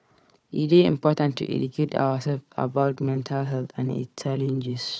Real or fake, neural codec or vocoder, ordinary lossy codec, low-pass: fake; codec, 16 kHz, 4 kbps, FunCodec, trained on Chinese and English, 50 frames a second; none; none